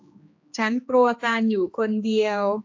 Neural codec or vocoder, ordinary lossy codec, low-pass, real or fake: codec, 16 kHz, 2 kbps, X-Codec, HuBERT features, trained on general audio; AAC, 48 kbps; 7.2 kHz; fake